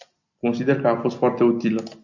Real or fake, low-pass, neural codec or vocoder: real; 7.2 kHz; none